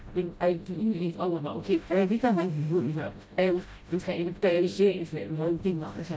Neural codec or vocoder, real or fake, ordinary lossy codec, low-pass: codec, 16 kHz, 0.5 kbps, FreqCodec, smaller model; fake; none; none